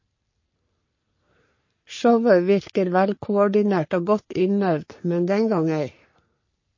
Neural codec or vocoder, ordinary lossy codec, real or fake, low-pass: codec, 44.1 kHz, 3.4 kbps, Pupu-Codec; MP3, 32 kbps; fake; 7.2 kHz